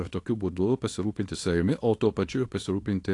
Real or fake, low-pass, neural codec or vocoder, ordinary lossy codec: fake; 10.8 kHz; codec, 24 kHz, 0.9 kbps, WavTokenizer, small release; AAC, 48 kbps